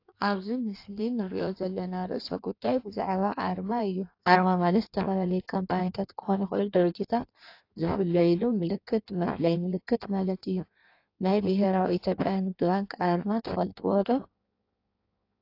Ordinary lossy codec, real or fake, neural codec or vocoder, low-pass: AAC, 32 kbps; fake; codec, 16 kHz in and 24 kHz out, 1.1 kbps, FireRedTTS-2 codec; 5.4 kHz